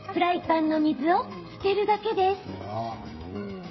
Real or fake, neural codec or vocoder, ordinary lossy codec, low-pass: fake; codec, 16 kHz, 16 kbps, FreqCodec, smaller model; MP3, 24 kbps; 7.2 kHz